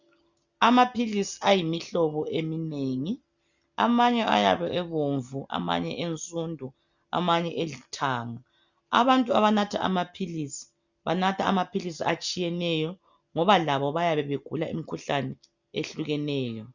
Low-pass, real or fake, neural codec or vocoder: 7.2 kHz; real; none